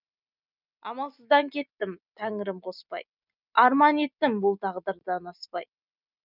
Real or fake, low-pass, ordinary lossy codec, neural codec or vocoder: real; 5.4 kHz; none; none